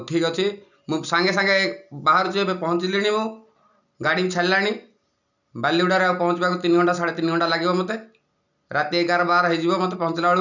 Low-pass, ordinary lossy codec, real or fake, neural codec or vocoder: 7.2 kHz; none; real; none